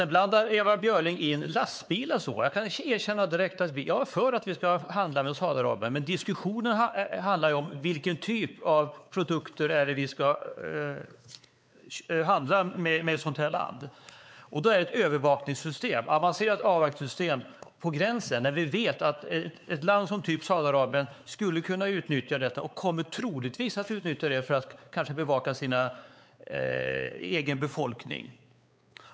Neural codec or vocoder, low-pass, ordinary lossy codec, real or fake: codec, 16 kHz, 4 kbps, X-Codec, WavLM features, trained on Multilingual LibriSpeech; none; none; fake